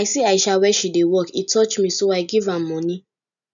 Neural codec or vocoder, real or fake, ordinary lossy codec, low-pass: none; real; none; 7.2 kHz